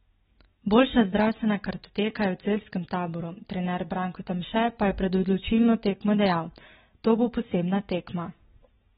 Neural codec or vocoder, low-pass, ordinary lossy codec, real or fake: none; 7.2 kHz; AAC, 16 kbps; real